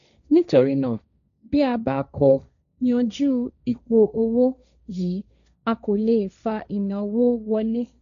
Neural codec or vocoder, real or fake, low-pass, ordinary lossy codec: codec, 16 kHz, 1.1 kbps, Voila-Tokenizer; fake; 7.2 kHz; none